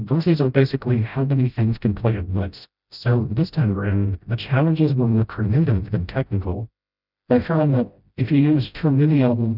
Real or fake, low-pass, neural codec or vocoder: fake; 5.4 kHz; codec, 16 kHz, 0.5 kbps, FreqCodec, smaller model